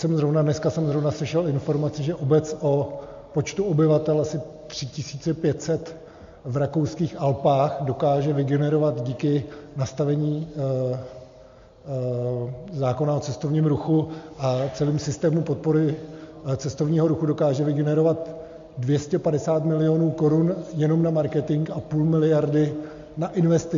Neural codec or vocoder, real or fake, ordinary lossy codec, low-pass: none; real; MP3, 48 kbps; 7.2 kHz